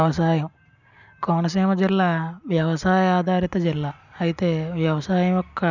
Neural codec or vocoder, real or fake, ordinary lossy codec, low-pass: none; real; none; 7.2 kHz